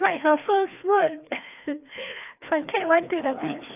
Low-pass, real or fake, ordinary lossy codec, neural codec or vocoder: 3.6 kHz; fake; none; codec, 16 kHz, 2 kbps, FreqCodec, larger model